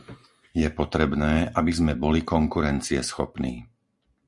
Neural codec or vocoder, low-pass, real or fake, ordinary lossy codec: none; 10.8 kHz; real; Opus, 64 kbps